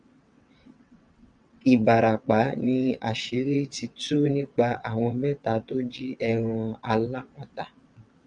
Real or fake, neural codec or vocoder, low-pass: fake; vocoder, 22.05 kHz, 80 mel bands, WaveNeXt; 9.9 kHz